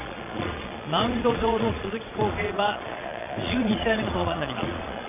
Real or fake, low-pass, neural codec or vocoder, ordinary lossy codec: fake; 3.6 kHz; vocoder, 22.05 kHz, 80 mel bands, Vocos; MP3, 32 kbps